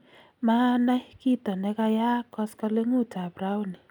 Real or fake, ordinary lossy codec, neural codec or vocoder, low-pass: real; none; none; 19.8 kHz